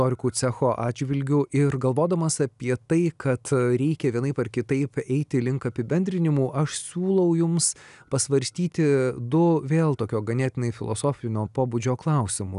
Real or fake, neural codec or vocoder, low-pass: real; none; 10.8 kHz